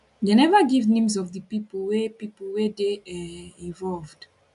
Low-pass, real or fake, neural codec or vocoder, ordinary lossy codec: 10.8 kHz; real; none; none